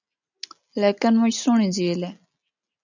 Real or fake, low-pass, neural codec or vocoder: real; 7.2 kHz; none